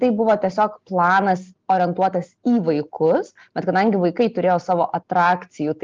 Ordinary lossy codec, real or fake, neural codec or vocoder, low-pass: Opus, 24 kbps; real; none; 7.2 kHz